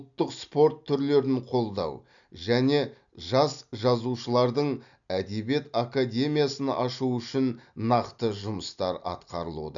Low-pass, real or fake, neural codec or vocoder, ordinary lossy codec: 7.2 kHz; real; none; none